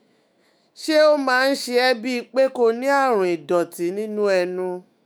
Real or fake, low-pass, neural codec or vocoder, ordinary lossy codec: fake; none; autoencoder, 48 kHz, 128 numbers a frame, DAC-VAE, trained on Japanese speech; none